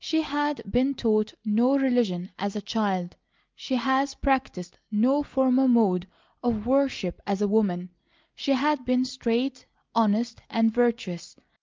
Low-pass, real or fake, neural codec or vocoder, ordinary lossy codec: 7.2 kHz; real; none; Opus, 32 kbps